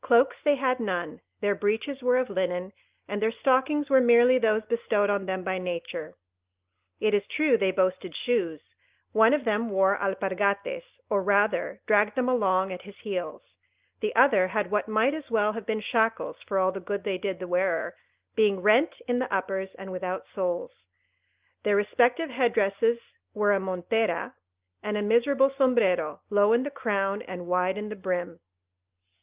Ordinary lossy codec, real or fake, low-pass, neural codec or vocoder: Opus, 24 kbps; real; 3.6 kHz; none